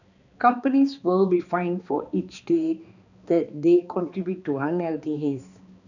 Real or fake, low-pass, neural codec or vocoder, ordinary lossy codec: fake; 7.2 kHz; codec, 16 kHz, 2 kbps, X-Codec, HuBERT features, trained on balanced general audio; none